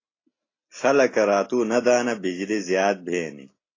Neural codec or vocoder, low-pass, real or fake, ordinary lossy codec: none; 7.2 kHz; real; AAC, 32 kbps